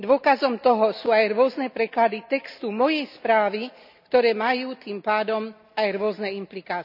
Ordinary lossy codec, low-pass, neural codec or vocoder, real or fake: none; 5.4 kHz; none; real